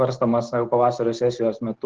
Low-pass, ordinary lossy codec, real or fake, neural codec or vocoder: 7.2 kHz; Opus, 16 kbps; real; none